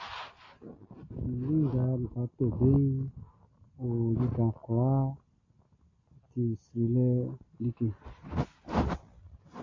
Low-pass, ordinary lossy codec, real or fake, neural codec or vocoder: 7.2 kHz; AAC, 32 kbps; real; none